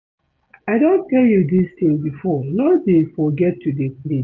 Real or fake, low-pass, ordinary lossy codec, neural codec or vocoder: real; 7.2 kHz; none; none